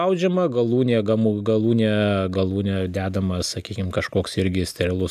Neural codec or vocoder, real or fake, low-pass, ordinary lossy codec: none; real; 14.4 kHz; AAC, 96 kbps